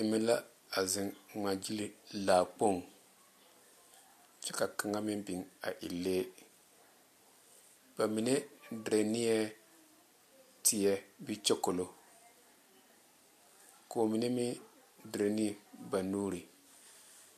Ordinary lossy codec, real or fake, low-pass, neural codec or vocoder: MP3, 64 kbps; real; 14.4 kHz; none